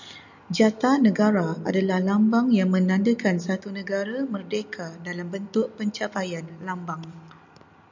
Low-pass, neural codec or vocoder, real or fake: 7.2 kHz; none; real